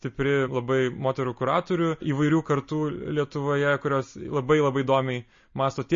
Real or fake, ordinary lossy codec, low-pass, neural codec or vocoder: real; MP3, 32 kbps; 7.2 kHz; none